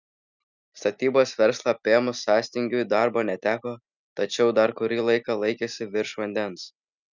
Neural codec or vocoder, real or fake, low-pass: none; real; 7.2 kHz